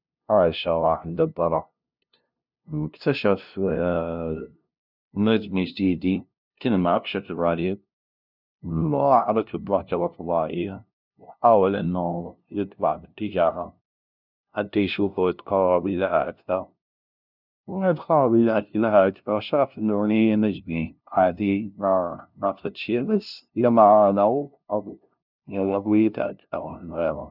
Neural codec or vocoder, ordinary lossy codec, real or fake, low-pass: codec, 16 kHz, 0.5 kbps, FunCodec, trained on LibriTTS, 25 frames a second; none; fake; 5.4 kHz